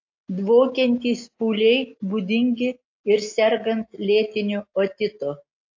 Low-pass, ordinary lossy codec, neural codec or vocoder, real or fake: 7.2 kHz; AAC, 48 kbps; none; real